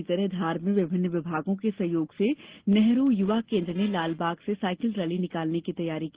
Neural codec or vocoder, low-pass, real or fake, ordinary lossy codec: none; 3.6 kHz; real; Opus, 16 kbps